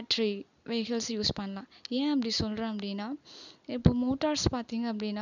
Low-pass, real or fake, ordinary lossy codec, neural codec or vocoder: 7.2 kHz; real; none; none